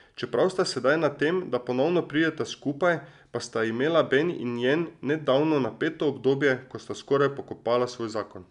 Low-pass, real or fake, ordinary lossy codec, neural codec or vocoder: 10.8 kHz; real; none; none